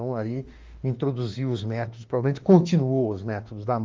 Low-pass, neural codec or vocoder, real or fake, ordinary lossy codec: 7.2 kHz; autoencoder, 48 kHz, 32 numbers a frame, DAC-VAE, trained on Japanese speech; fake; Opus, 32 kbps